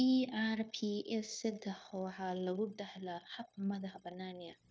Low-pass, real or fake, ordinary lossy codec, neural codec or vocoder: none; fake; none; codec, 16 kHz, 0.9 kbps, LongCat-Audio-Codec